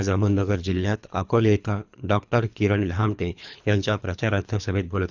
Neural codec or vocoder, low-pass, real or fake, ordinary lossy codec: codec, 24 kHz, 3 kbps, HILCodec; 7.2 kHz; fake; none